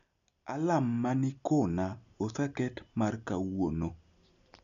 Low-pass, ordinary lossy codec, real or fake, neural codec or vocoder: 7.2 kHz; none; real; none